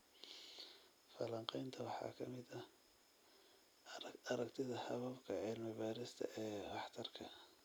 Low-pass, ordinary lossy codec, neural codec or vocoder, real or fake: none; none; none; real